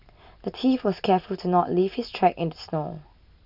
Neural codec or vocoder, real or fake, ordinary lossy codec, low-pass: none; real; none; 5.4 kHz